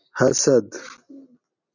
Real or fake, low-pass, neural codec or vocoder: real; 7.2 kHz; none